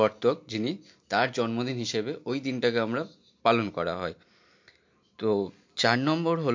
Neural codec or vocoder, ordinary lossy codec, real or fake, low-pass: none; MP3, 48 kbps; real; 7.2 kHz